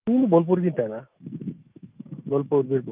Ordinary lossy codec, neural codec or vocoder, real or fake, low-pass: Opus, 32 kbps; autoencoder, 48 kHz, 128 numbers a frame, DAC-VAE, trained on Japanese speech; fake; 3.6 kHz